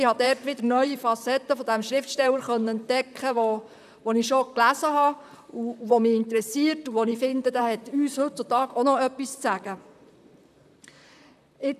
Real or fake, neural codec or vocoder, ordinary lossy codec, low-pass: fake; vocoder, 44.1 kHz, 128 mel bands, Pupu-Vocoder; none; 14.4 kHz